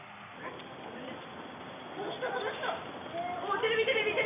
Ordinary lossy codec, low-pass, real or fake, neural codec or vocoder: none; 3.6 kHz; real; none